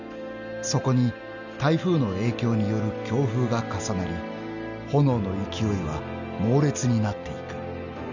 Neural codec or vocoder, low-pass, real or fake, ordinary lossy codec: none; 7.2 kHz; real; none